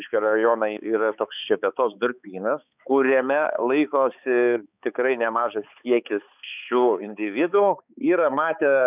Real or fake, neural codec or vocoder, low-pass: fake; codec, 16 kHz, 4 kbps, X-Codec, HuBERT features, trained on balanced general audio; 3.6 kHz